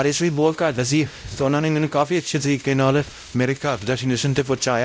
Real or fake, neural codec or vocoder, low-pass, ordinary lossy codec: fake; codec, 16 kHz, 0.5 kbps, X-Codec, WavLM features, trained on Multilingual LibriSpeech; none; none